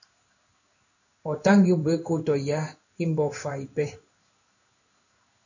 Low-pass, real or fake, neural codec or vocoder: 7.2 kHz; fake; codec, 16 kHz in and 24 kHz out, 1 kbps, XY-Tokenizer